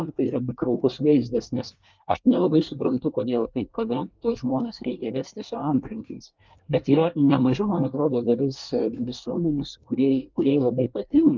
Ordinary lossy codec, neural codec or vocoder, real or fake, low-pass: Opus, 24 kbps; codec, 24 kHz, 1 kbps, SNAC; fake; 7.2 kHz